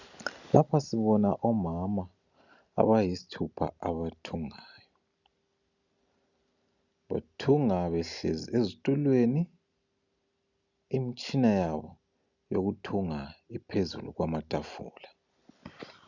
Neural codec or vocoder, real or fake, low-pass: none; real; 7.2 kHz